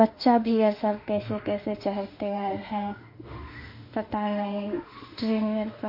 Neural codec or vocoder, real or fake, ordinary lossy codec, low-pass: autoencoder, 48 kHz, 32 numbers a frame, DAC-VAE, trained on Japanese speech; fake; MP3, 32 kbps; 5.4 kHz